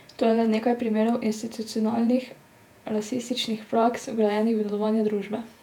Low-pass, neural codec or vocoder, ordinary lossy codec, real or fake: 19.8 kHz; vocoder, 48 kHz, 128 mel bands, Vocos; none; fake